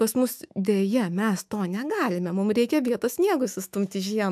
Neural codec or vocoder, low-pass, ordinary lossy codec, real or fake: autoencoder, 48 kHz, 128 numbers a frame, DAC-VAE, trained on Japanese speech; 14.4 kHz; AAC, 96 kbps; fake